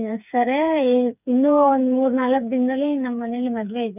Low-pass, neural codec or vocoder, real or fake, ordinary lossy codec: 3.6 kHz; codec, 16 kHz, 4 kbps, FreqCodec, smaller model; fake; none